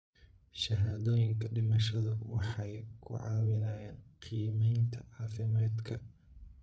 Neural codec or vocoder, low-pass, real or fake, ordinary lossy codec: codec, 16 kHz, 8 kbps, FreqCodec, larger model; none; fake; none